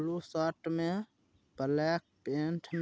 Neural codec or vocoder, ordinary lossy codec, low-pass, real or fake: none; none; none; real